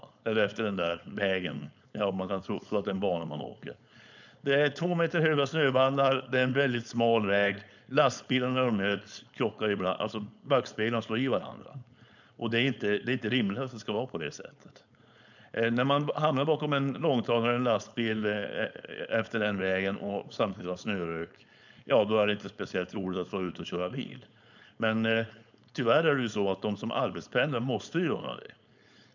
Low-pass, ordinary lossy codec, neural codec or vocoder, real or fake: 7.2 kHz; none; codec, 16 kHz, 4.8 kbps, FACodec; fake